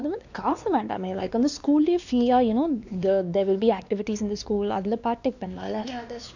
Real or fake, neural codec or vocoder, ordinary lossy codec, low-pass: fake; codec, 16 kHz, 2 kbps, X-Codec, WavLM features, trained on Multilingual LibriSpeech; none; 7.2 kHz